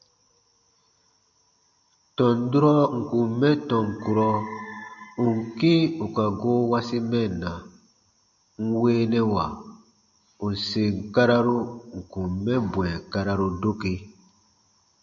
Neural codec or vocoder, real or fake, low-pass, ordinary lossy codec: none; real; 7.2 kHz; AAC, 64 kbps